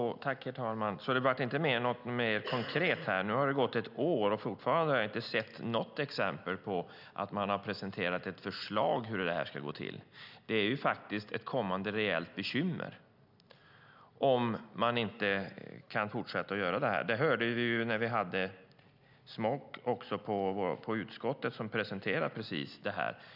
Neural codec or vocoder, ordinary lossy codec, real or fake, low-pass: none; none; real; 5.4 kHz